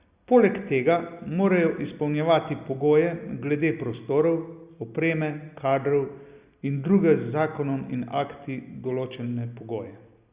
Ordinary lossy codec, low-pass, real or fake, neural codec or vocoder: Opus, 64 kbps; 3.6 kHz; real; none